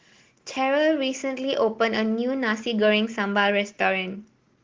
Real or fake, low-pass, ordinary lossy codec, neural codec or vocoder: real; 7.2 kHz; Opus, 16 kbps; none